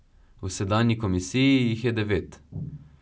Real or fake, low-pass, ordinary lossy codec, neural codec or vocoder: real; none; none; none